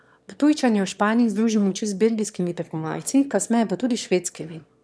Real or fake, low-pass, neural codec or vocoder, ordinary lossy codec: fake; none; autoencoder, 22.05 kHz, a latent of 192 numbers a frame, VITS, trained on one speaker; none